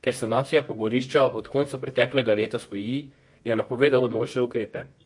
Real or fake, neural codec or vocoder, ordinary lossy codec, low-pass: fake; codec, 24 kHz, 0.9 kbps, WavTokenizer, medium music audio release; MP3, 48 kbps; 10.8 kHz